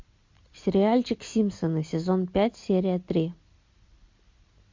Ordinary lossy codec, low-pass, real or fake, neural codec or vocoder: MP3, 48 kbps; 7.2 kHz; real; none